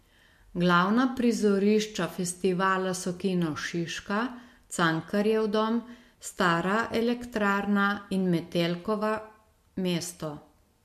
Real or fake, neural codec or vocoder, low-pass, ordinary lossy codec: real; none; 14.4 kHz; MP3, 64 kbps